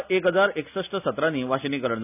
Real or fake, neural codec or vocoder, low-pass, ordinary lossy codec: real; none; 3.6 kHz; none